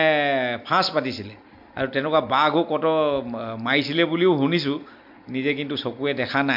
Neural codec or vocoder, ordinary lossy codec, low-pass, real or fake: none; MP3, 48 kbps; 5.4 kHz; real